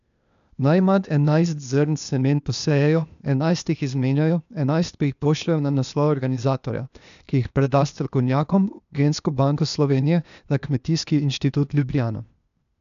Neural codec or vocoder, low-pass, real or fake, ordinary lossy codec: codec, 16 kHz, 0.8 kbps, ZipCodec; 7.2 kHz; fake; none